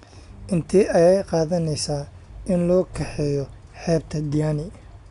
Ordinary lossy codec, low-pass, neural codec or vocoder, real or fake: none; 10.8 kHz; none; real